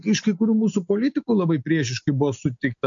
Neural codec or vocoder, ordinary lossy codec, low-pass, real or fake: none; MP3, 48 kbps; 7.2 kHz; real